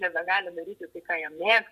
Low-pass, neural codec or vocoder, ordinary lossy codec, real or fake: 14.4 kHz; vocoder, 48 kHz, 128 mel bands, Vocos; MP3, 64 kbps; fake